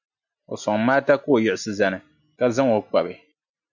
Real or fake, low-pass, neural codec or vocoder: real; 7.2 kHz; none